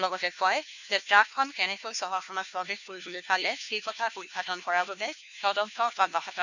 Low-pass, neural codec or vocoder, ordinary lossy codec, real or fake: 7.2 kHz; codec, 16 kHz, 1 kbps, FunCodec, trained on LibriTTS, 50 frames a second; none; fake